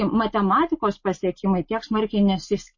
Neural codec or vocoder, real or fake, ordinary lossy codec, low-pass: none; real; MP3, 32 kbps; 7.2 kHz